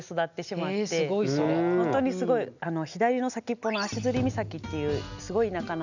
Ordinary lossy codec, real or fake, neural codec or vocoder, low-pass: none; real; none; 7.2 kHz